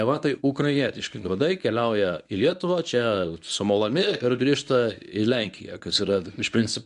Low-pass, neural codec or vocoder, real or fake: 10.8 kHz; codec, 24 kHz, 0.9 kbps, WavTokenizer, medium speech release version 1; fake